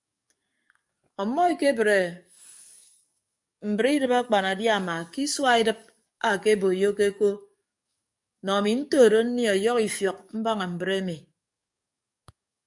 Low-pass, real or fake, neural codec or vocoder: 10.8 kHz; fake; codec, 44.1 kHz, 7.8 kbps, DAC